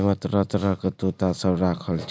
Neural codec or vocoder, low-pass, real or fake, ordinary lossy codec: none; none; real; none